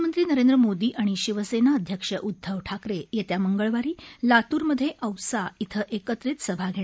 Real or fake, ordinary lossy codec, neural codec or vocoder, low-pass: real; none; none; none